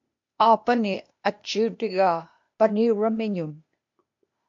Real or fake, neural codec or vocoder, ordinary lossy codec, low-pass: fake; codec, 16 kHz, 0.8 kbps, ZipCodec; MP3, 48 kbps; 7.2 kHz